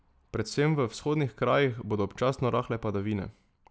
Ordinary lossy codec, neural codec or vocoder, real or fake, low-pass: none; none; real; none